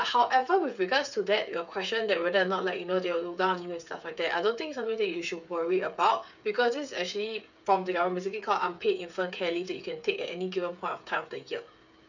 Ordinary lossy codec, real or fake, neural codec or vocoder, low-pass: none; fake; codec, 16 kHz, 8 kbps, FreqCodec, smaller model; 7.2 kHz